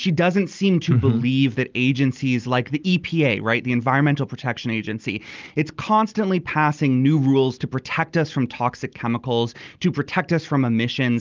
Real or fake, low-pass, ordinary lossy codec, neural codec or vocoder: real; 7.2 kHz; Opus, 24 kbps; none